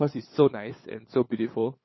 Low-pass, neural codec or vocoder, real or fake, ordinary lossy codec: 7.2 kHz; codec, 16 kHz, 8 kbps, FunCodec, trained on LibriTTS, 25 frames a second; fake; MP3, 24 kbps